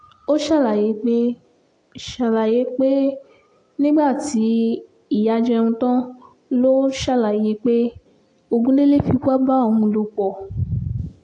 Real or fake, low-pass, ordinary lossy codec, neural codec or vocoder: real; 9.9 kHz; AAC, 48 kbps; none